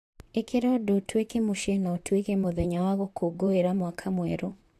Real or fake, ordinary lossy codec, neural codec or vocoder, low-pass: fake; AAC, 64 kbps; vocoder, 44.1 kHz, 128 mel bands, Pupu-Vocoder; 14.4 kHz